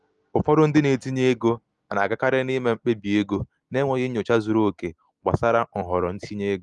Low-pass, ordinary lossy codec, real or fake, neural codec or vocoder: 10.8 kHz; Opus, 24 kbps; real; none